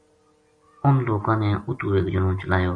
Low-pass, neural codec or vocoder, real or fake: 9.9 kHz; none; real